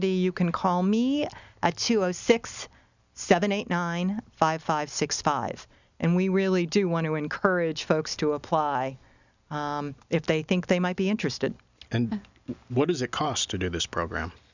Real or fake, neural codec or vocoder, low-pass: real; none; 7.2 kHz